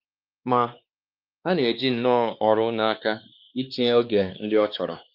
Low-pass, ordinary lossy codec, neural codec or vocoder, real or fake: 5.4 kHz; Opus, 24 kbps; codec, 16 kHz, 2 kbps, X-Codec, WavLM features, trained on Multilingual LibriSpeech; fake